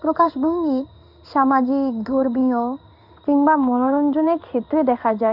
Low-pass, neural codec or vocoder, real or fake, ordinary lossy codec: 5.4 kHz; codec, 16 kHz in and 24 kHz out, 1 kbps, XY-Tokenizer; fake; none